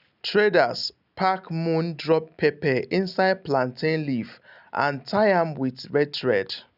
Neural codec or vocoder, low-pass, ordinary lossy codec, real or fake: none; 5.4 kHz; none; real